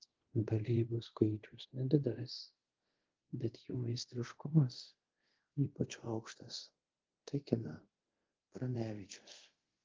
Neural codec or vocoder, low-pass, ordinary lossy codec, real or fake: codec, 24 kHz, 0.5 kbps, DualCodec; 7.2 kHz; Opus, 16 kbps; fake